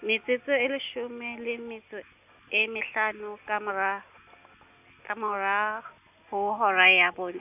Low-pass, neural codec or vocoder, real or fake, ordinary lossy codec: 3.6 kHz; none; real; none